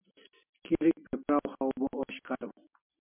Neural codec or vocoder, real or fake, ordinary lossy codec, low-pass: none; real; MP3, 32 kbps; 3.6 kHz